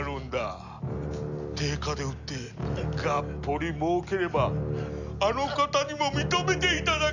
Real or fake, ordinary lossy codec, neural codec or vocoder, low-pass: real; none; none; 7.2 kHz